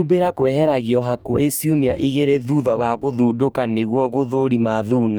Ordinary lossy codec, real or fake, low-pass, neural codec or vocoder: none; fake; none; codec, 44.1 kHz, 2.6 kbps, DAC